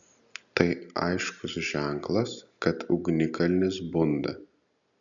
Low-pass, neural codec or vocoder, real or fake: 7.2 kHz; none; real